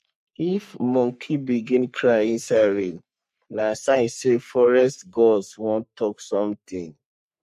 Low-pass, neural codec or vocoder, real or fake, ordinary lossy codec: 14.4 kHz; codec, 44.1 kHz, 3.4 kbps, Pupu-Codec; fake; MP3, 64 kbps